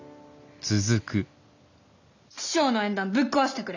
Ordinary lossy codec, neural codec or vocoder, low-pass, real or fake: none; none; 7.2 kHz; real